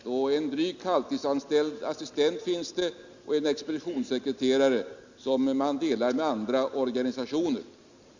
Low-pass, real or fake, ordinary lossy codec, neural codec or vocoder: 7.2 kHz; real; Opus, 64 kbps; none